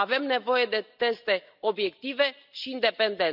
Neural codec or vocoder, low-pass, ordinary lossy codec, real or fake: none; 5.4 kHz; none; real